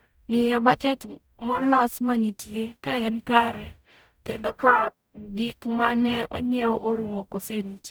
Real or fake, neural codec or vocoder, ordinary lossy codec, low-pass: fake; codec, 44.1 kHz, 0.9 kbps, DAC; none; none